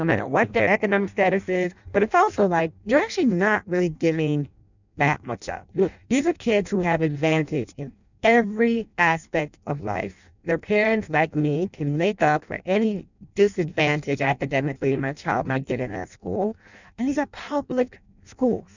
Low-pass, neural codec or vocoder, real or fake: 7.2 kHz; codec, 16 kHz in and 24 kHz out, 0.6 kbps, FireRedTTS-2 codec; fake